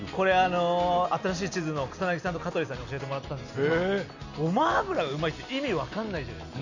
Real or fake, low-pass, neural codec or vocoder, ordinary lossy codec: real; 7.2 kHz; none; none